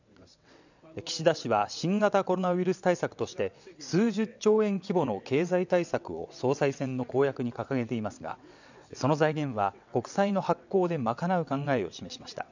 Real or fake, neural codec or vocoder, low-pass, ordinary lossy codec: fake; vocoder, 22.05 kHz, 80 mel bands, WaveNeXt; 7.2 kHz; none